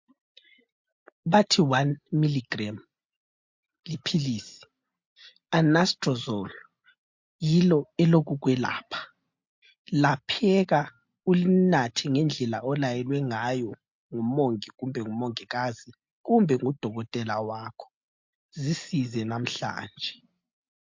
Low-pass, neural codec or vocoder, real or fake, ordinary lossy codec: 7.2 kHz; none; real; MP3, 48 kbps